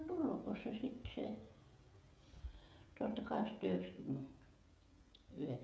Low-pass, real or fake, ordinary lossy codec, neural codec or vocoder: none; fake; none; codec, 16 kHz, 16 kbps, FreqCodec, smaller model